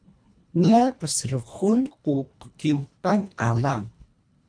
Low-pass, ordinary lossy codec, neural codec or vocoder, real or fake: 9.9 kHz; MP3, 96 kbps; codec, 24 kHz, 1.5 kbps, HILCodec; fake